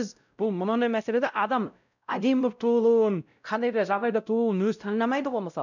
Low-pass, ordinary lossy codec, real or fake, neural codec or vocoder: 7.2 kHz; none; fake; codec, 16 kHz, 0.5 kbps, X-Codec, WavLM features, trained on Multilingual LibriSpeech